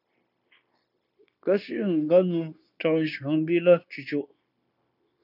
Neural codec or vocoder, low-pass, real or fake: codec, 16 kHz, 0.9 kbps, LongCat-Audio-Codec; 5.4 kHz; fake